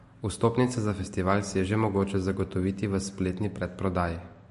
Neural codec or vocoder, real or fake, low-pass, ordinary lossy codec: none; real; 14.4 kHz; MP3, 48 kbps